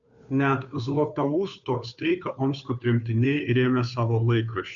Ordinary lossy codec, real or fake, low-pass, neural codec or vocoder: MP3, 96 kbps; fake; 7.2 kHz; codec, 16 kHz, 2 kbps, FunCodec, trained on Chinese and English, 25 frames a second